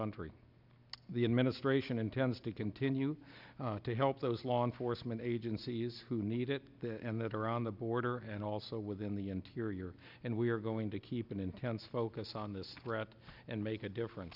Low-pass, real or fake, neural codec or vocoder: 5.4 kHz; real; none